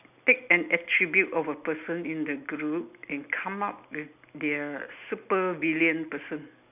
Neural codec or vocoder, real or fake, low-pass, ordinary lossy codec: none; real; 3.6 kHz; none